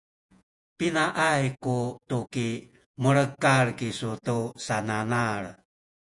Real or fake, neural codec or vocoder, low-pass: fake; vocoder, 48 kHz, 128 mel bands, Vocos; 10.8 kHz